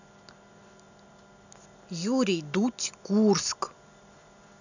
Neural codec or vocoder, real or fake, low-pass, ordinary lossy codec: none; real; 7.2 kHz; none